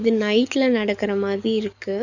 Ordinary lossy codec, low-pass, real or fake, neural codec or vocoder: none; 7.2 kHz; fake; codec, 44.1 kHz, 7.8 kbps, DAC